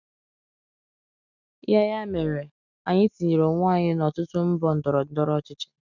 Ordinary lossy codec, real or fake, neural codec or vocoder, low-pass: none; real; none; 7.2 kHz